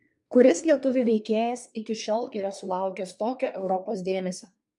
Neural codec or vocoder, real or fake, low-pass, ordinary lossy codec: codec, 24 kHz, 1 kbps, SNAC; fake; 10.8 kHz; MP3, 64 kbps